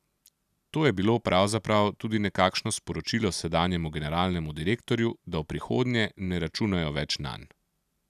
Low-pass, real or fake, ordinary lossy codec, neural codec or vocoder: 14.4 kHz; real; none; none